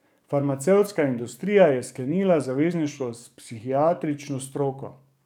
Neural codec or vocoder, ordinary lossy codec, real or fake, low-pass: codec, 44.1 kHz, 7.8 kbps, DAC; none; fake; 19.8 kHz